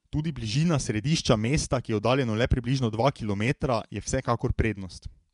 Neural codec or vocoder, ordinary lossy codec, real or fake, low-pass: vocoder, 24 kHz, 100 mel bands, Vocos; MP3, 96 kbps; fake; 10.8 kHz